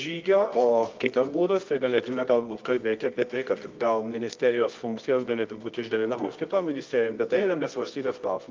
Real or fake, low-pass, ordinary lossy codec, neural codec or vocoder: fake; 7.2 kHz; Opus, 24 kbps; codec, 24 kHz, 0.9 kbps, WavTokenizer, medium music audio release